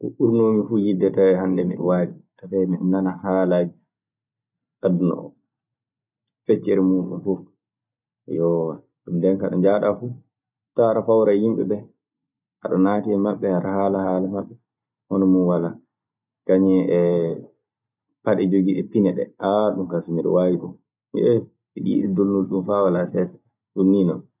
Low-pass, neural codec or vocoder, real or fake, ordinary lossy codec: 3.6 kHz; none; real; none